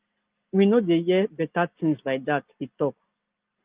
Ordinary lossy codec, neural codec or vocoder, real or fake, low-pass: Opus, 32 kbps; none; real; 3.6 kHz